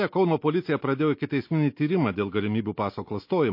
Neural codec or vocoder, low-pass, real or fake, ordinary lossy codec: none; 5.4 kHz; real; MP3, 32 kbps